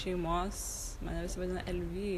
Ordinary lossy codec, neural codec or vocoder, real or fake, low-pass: AAC, 64 kbps; none; real; 14.4 kHz